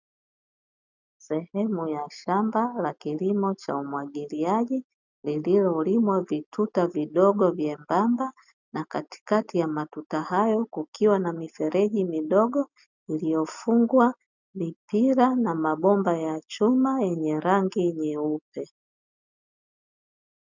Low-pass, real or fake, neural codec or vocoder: 7.2 kHz; real; none